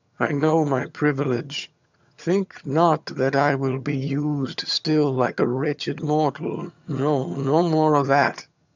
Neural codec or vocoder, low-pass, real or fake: vocoder, 22.05 kHz, 80 mel bands, HiFi-GAN; 7.2 kHz; fake